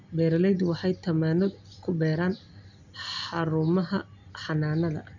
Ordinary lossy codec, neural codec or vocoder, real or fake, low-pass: none; none; real; 7.2 kHz